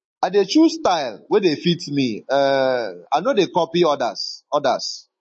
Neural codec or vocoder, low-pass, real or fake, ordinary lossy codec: autoencoder, 48 kHz, 128 numbers a frame, DAC-VAE, trained on Japanese speech; 10.8 kHz; fake; MP3, 32 kbps